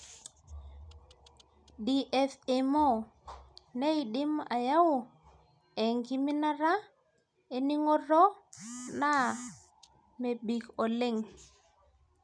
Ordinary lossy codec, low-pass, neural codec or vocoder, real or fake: none; 9.9 kHz; none; real